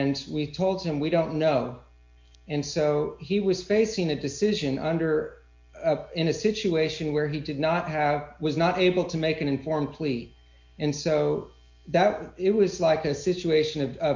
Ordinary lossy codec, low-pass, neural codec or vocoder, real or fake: MP3, 48 kbps; 7.2 kHz; none; real